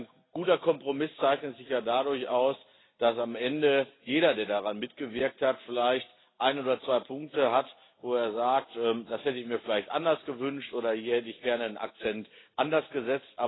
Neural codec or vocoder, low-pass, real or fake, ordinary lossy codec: none; 7.2 kHz; real; AAC, 16 kbps